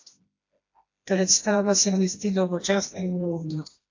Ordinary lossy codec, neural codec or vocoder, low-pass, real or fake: AAC, 48 kbps; codec, 16 kHz, 1 kbps, FreqCodec, smaller model; 7.2 kHz; fake